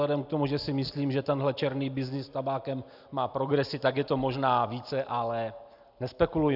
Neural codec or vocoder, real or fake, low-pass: none; real; 5.4 kHz